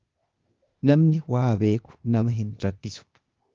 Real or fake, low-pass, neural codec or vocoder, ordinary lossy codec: fake; 7.2 kHz; codec, 16 kHz, 0.8 kbps, ZipCodec; Opus, 32 kbps